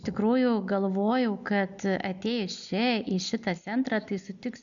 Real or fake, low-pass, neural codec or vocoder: real; 7.2 kHz; none